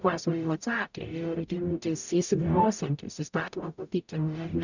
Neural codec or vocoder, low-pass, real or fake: codec, 44.1 kHz, 0.9 kbps, DAC; 7.2 kHz; fake